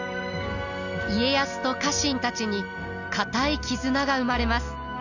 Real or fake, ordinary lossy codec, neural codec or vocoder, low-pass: real; Opus, 64 kbps; none; 7.2 kHz